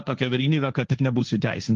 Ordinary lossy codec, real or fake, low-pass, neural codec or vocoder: Opus, 32 kbps; fake; 7.2 kHz; codec, 16 kHz, 1.1 kbps, Voila-Tokenizer